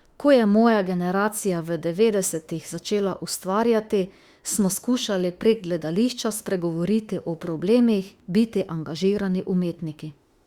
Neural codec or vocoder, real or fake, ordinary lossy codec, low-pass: autoencoder, 48 kHz, 32 numbers a frame, DAC-VAE, trained on Japanese speech; fake; Opus, 64 kbps; 19.8 kHz